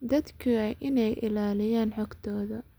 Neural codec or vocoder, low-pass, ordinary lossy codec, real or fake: none; none; none; real